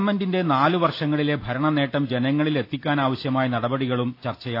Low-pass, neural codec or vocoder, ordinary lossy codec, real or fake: 5.4 kHz; none; AAC, 32 kbps; real